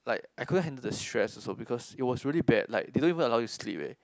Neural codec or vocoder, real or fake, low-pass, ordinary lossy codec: none; real; none; none